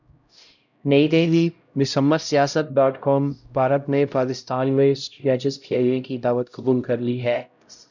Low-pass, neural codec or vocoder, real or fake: 7.2 kHz; codec, 16 kHz, 0.5 kbps, X-Codec, HuBERT features, trained on LibriSpeech; fake